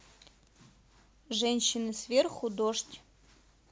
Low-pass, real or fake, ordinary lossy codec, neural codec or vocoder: none; real; none; none